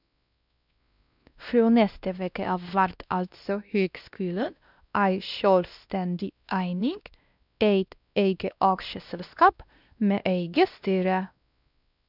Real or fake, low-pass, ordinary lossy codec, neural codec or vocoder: fake; 5.4 kHz; none; codec, 16 kHz, 1 kbps, X-Codec, WavLM features, trained on Multilingual LibriSpeech